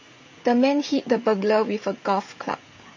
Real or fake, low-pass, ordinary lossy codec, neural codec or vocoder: fake; 7.2 kHz; MP3, 32 kbps; codec, 16 kHz, 16 kbps, FreqCodec, smaller model